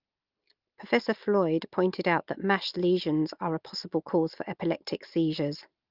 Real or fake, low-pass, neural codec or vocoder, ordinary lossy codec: real; 5.4 kHz; none; Opus, 32 kbps